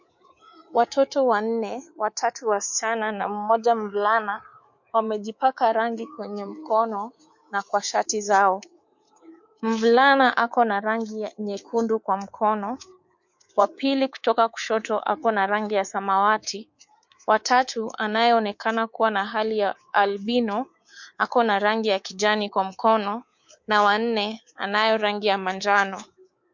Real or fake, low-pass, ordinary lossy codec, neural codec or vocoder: fake; 7.2 kHz; MP3, 48 kbps; codec, 24 kHz, 3.1 kbps, DualCodec